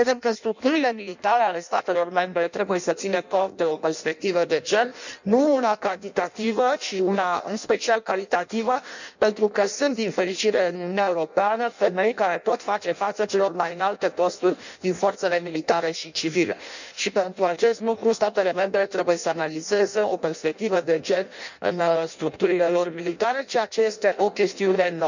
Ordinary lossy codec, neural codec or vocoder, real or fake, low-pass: none; codec, 16 kHz in and 24 kHz out, 0.6 kbps, FireRedTTS-2 codec; fake; 7.2 kHz